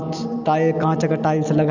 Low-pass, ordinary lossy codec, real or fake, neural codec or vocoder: 7.2 kHz; none; real; none